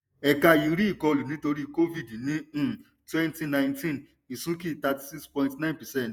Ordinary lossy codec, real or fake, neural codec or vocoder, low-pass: none; fake; vocoder, 48 kHz, 128 mel bands, Vocos; none